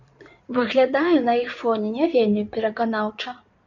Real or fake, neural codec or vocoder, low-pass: fake; codec, 16 kHz in and 24 kHz out, 2.2 kbps, FireRedTTS-2 codec; 7.2 kHz